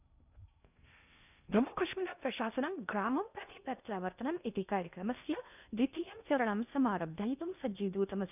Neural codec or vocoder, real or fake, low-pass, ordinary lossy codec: codec, 16 kHz in and 24 kHz out, 0.6 kbps, FocalCodec, streaming, 2048 codes; fake; 3.6 kHz; none